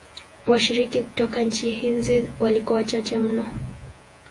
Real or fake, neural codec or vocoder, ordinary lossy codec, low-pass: fake; vocoder, 48 kHz, 128 mel bands, Vocos; AAC, 48 kbps; 10.8 kHz